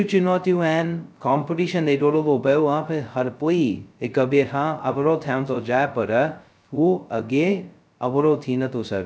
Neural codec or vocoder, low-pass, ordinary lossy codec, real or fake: codec, 16 kHz, 0.2 kbps, FocalCodec; none; none; fake